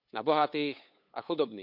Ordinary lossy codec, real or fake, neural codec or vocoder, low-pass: none; fake; codec, 16 kHz, 8 kbps, FunCodec, trained on LibriTTS, 25 frames a second; 5.4 kHz